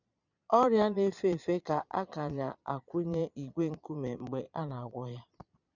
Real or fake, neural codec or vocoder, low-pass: fake; vocoder, 22.05 kHz, 80 mel bands, Vocos; 7.2 kHz